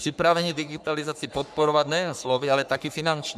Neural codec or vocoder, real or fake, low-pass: codec, 44.1 kHz, 3.4 kbps, Pupu-Codec; fake; 14.4 kHz